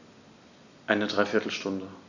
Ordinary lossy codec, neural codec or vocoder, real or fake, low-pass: none; none; real; 7.2 kHz